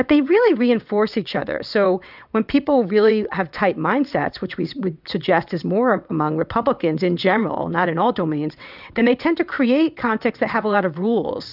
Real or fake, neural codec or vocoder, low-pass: fake; vocoder, 22.05 kHz, 80 mel bands, WaveNeXt; 5.4 kHz